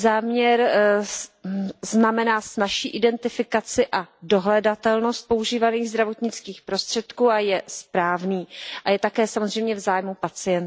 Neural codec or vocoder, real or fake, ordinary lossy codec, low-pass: none; real; none; none